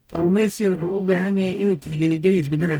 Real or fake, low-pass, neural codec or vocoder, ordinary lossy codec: fake; none; codec, 44.1 kHz, 0.9 kbps, DAC; none